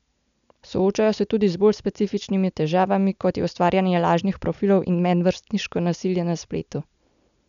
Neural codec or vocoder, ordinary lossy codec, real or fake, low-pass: none; none; real; 7.2 kHz